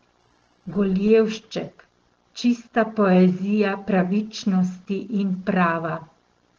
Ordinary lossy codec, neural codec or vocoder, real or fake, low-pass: Opus, 16 kbps; none; real; 7.2 kHz